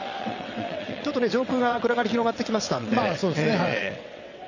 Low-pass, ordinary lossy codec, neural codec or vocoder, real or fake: 7.2 kHz; none; vocoder, 22.05 kHz, 80 mel bands, WaveNeXt; fake